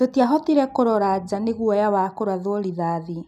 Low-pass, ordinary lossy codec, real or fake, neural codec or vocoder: 14.4 kHz; none; real; none